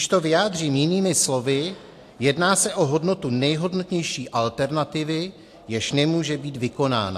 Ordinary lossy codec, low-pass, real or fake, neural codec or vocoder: AAC, 64 kbps; 14.4 kHz; real; none